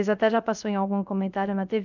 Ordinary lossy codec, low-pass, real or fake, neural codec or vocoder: none; 7.2 kHz; fake; codec, 16 kHz, 0.3 kbps, FocalCodec